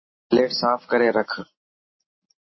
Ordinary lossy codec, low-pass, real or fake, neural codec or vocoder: MP3, 24 kbps; 7.2 kHz; real; none